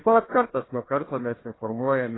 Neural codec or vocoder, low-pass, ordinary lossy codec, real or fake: codec, 16 kHz, 1 kbps, FunCodec, trained on Chinese and English, 50 frames a second; 7.2 kHz; AAC, 16 kbps; fake